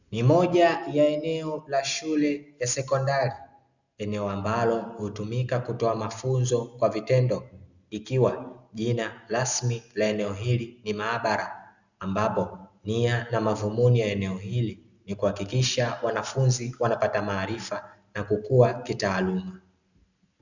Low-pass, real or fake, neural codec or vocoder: 7.2 kHz; real; none